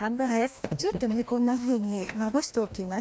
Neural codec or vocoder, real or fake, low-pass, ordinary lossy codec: codec, 16 kHz, 1 kbps, FreqCodec, larger model; fake; none; none